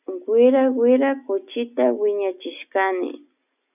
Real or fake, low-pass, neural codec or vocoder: real; 3.6 kHz; none